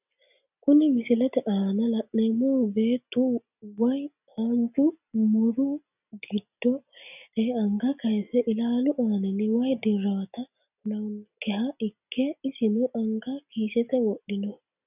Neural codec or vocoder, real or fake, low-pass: none; real; 3.6 kHz